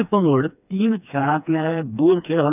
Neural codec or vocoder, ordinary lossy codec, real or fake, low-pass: codec, 16 kHz, 2 kbps, FreqCodec, smaller model; none; fake; 3.6 kHz